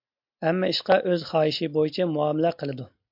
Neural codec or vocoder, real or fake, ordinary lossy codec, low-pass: none; real; MP3, 48 kbps; 5.4 kHz